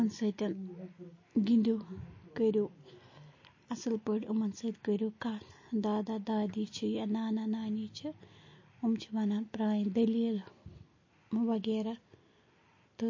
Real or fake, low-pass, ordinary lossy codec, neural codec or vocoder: real; 7.2 kHz; MP3, 32 kbps; none